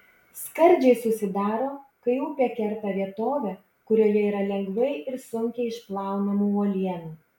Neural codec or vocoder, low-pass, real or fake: none; 19.8 kHz; real